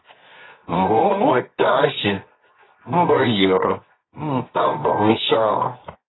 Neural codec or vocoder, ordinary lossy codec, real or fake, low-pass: codec, 24 kHz, 0.9 kbps, WavTokenizer, medium music audio release; AAC, 16 kbps; fake; 7.2 kHz